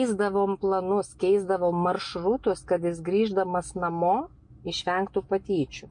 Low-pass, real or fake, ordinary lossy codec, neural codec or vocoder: 9.9 kHz; real; MP3, 48 kbps; none